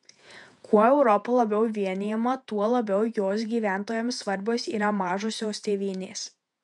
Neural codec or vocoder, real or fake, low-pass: vocoder, 48 kHz, 128 mel bands, Vocos; fake; 10.8 kHz